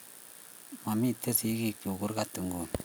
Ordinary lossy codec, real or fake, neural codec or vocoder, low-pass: none; real; none; none